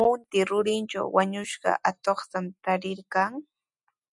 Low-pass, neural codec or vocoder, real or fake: 10.8 kHz; none; real